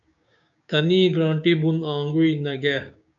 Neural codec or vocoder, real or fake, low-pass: codec, 16 kHz, 6 kbps, DAC; fake; 7.2 kHz